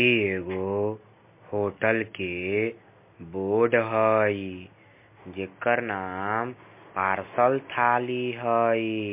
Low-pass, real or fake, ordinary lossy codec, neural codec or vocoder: 3.6 kHz; real; MP3, 24 kbps; none